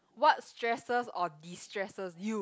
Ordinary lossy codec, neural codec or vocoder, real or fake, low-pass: none; none; real; none